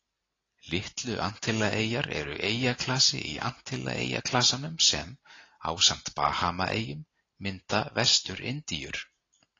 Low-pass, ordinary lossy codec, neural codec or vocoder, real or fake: 7.2 kHz; AAC, 32 kbps; none; real